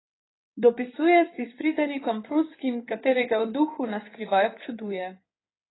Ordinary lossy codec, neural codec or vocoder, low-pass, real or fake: AAC, 16 kbps; codec, 16 kHz in and 24 kHz out, 2.2 kbps, FireRedTTS-2 codec; 7.2 kHz; fake